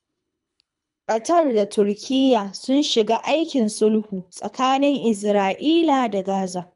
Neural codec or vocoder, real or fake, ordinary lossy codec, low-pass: codec, 24 kHz, 3 kbps, HILCodec; fake; none; 10.8 kHz